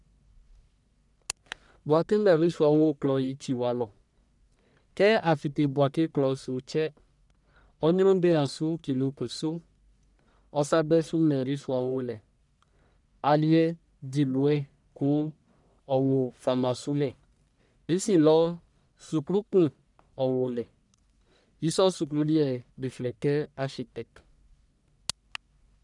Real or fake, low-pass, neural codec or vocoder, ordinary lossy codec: fake; 10.8 kHz; codec, 44.1 kHz, 1.7 kbps, Pupu-Codec; none